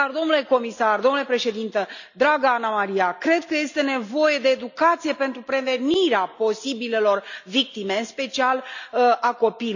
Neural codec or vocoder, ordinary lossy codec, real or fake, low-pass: none; none; real; 7.2 kHz